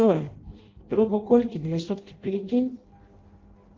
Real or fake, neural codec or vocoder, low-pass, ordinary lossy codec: fake; codec, 16 kHz in and 24 kHz out, 0.6 kbps, FireRedTTS-2 codec; 7.2 kHz; Opus, 16 kbps